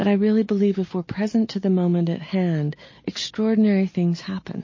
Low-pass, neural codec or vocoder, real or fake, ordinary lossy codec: 7.2 kHz; none; real; MP3, 32 kbps